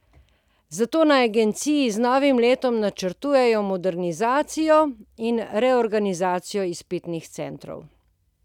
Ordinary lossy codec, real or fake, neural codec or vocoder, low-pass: none; real; none; 19.8 kHz